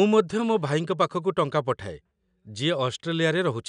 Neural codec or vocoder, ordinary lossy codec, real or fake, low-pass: none; none; real; 9.9 kHz